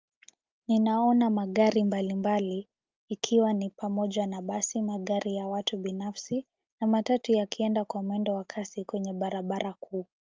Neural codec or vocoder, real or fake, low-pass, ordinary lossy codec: none; real; 7.2 kHz; Opus, 24 kbps